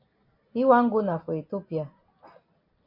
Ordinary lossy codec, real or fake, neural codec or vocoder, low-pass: MP3, 32 kbps; real; none; 5.4 kHz